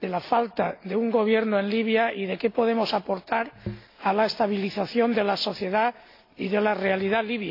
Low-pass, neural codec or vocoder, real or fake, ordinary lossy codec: 5.4 kHz; none; real; AAC, 32 kbps